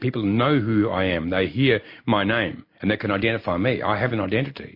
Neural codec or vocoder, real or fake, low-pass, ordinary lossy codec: none; real; 5.4 kHz; MP3, 32 kbps